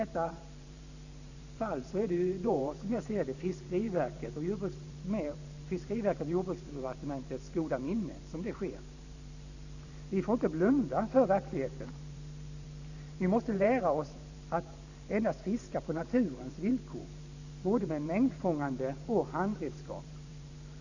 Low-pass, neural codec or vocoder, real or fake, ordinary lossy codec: 7.2 kHz; vocoder, 44.1 kHz, 128 mel bands every 512 samples, BigVGAN v2; fake; none